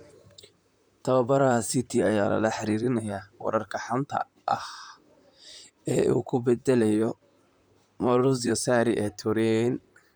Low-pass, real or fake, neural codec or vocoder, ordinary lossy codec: none; fake; vocoder, 44.1 kHz, 128 mel bands, Pupu-Vocoder; none